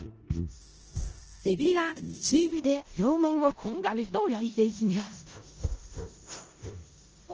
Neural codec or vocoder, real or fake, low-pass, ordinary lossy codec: codec, 16 kHz in and 24 kHz out, 0.4 kbps, LongCat-Audio-Codec, four codebook decoder; fake; 7.2 kHz; Opus, 16 kbps